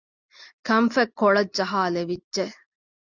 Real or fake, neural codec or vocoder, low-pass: real; none; 7.2 kHz